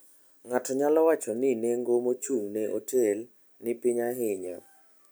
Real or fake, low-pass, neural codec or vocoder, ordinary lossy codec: real; none; none; none